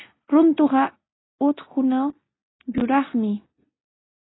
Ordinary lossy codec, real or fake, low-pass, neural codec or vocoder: AAC, 16 kbps; real; 7.2 kHz; none